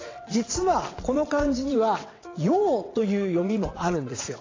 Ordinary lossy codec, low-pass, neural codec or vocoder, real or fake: AAC, 32 kbps; 7.2 kHz; vocoder, 22.05 kHz, 80 mel bands, WaveNeXt; fake